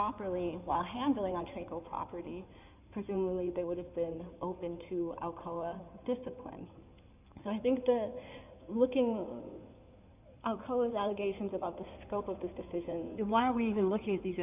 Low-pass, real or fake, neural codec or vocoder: 3.6 kHz; fake; codec, 16 kHz in and 24 kHz out, 2.2 kbps, FireRedTTS-2 codec